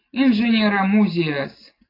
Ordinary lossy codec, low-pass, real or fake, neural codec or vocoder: AAC, 24 kbps; 5.4 kHz; real; none